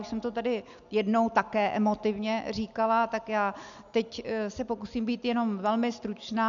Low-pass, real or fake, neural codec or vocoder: 7.2 kHz; real; none